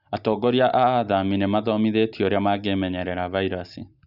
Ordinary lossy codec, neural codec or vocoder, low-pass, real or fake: none; none; 5.4 kHz; real